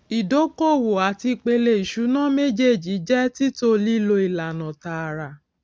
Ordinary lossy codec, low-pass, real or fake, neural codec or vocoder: none; none; real; none